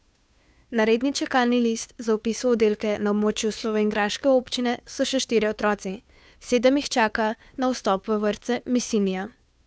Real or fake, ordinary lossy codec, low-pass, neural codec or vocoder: fake; none; none; codec, 16 kHz, 2 kbps, FunCodec, trained on Chinese and English, 25 frames a second